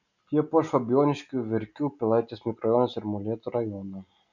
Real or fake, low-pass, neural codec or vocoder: real; 7.2 kHz; none